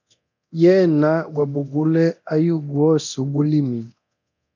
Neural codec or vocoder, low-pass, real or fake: codec, 24 kHz, 0.9 kbps, DualCodec; 7.2 kHz; fake